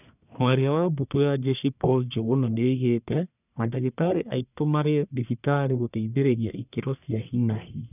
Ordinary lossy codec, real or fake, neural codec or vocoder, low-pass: none; fake; codec, 44.1 kHz, 1.7 kbps, Pupu-Codec; 3.6 kHz